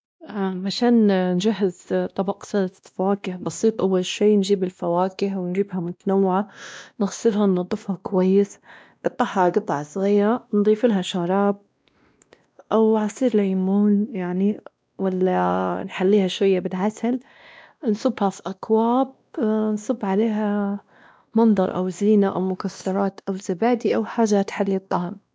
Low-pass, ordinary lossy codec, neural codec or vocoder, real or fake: none; none; codec, 16 kHz, 1 kbps, X-Codec, WavLM features, trained on Multilingual LibriSpeech; fake